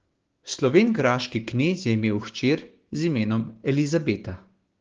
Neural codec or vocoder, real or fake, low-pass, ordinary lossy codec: codec, 16 kHz, 6 kbps, DAC; fake; 7.2 kHz; Opus, 16 kbps